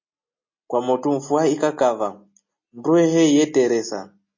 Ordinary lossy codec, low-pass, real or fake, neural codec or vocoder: MP3, 32 kbps; 7.2 kHz; real; none